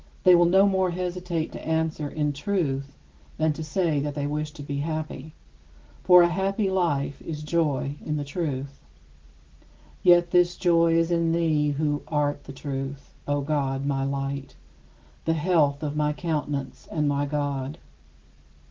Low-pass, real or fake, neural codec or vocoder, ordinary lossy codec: 7.2 kHz; real; none; Opus, 16 kbps